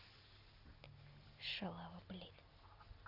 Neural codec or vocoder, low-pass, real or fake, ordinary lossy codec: none; 5.4 kHz; real; none